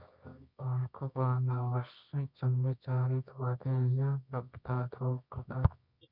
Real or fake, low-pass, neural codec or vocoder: fake; 5.4 kHz; codec, 24 kHz, 0.9 kbps, WavTokenizer, medium music audio release